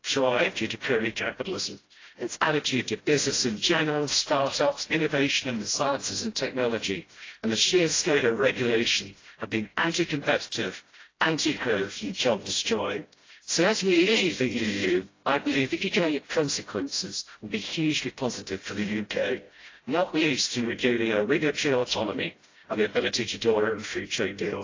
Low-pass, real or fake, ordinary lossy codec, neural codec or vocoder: 7.2 kHz; fake; AAC, 32 kbps; codec, 16 kHz, 0.5 kbps, FreqCodec, smaller model